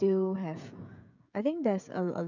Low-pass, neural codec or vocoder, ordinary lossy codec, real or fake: 7.2 kHz; codec, 16 kHz, 16 kbps, FreqCodec, smaller model; none; fake